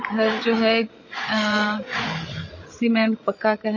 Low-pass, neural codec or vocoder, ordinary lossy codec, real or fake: 7.2 kHz; codec, 16 kHz, 8 kbps, FreqCodec, larger model; MP3, 32 kbps; fake